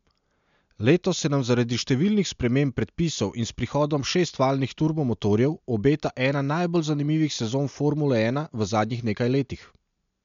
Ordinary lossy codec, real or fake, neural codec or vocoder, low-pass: MP3, 64 kbps; real; none; 7.2 kHz